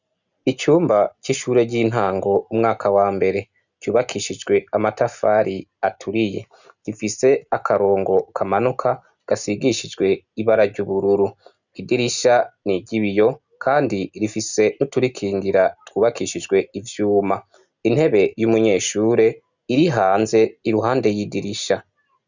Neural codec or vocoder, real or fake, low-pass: none; real; 7.2 kHz